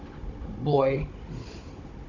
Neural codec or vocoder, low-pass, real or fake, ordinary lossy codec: codec, 16 kHz, 16 kbps, FunCodec, trained on Chinese and English, 50 frames a second; 7.2 kHz; fake; none